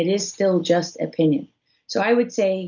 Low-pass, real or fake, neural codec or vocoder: 7.2 kHz; real; none